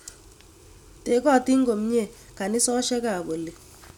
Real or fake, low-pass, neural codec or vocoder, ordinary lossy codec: real; 19.8 kHz; none; none